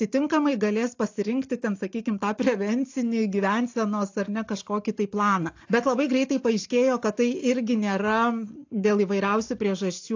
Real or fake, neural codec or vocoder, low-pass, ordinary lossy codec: real; none; 7.2 kHz; AAC, 48 kbps